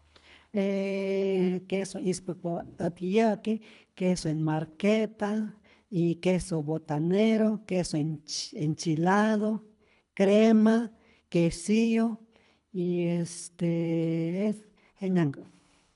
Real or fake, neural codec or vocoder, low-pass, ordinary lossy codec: fake; codec, 24 kHz, 3 kbps, HILCodec; 10.8 kHz; none